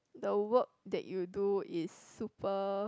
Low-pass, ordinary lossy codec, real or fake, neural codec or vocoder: none; none; real; none